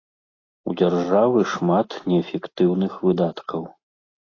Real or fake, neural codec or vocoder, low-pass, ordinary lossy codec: real; none; 7.2 kHz; AAC, 32 kbps